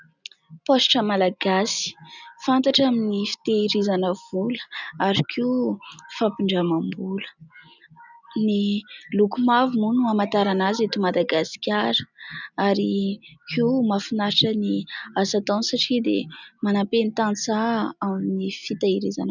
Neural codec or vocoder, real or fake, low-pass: none; real; 7.2 kHz